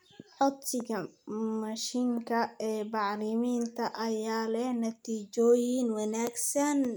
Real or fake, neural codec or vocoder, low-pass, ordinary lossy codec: real; none; none; none